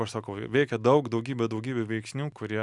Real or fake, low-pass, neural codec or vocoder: real; 10.8 kHz; none